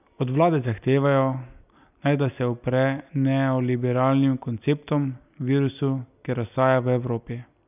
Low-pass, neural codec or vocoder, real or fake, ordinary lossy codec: 3.6 kHz; none; real; none